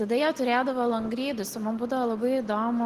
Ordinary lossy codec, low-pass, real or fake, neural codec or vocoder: Opus, 16 kbps; 14.4 kHz; real; none